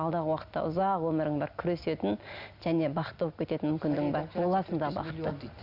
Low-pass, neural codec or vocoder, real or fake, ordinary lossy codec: 5.4 kHz; none; real; none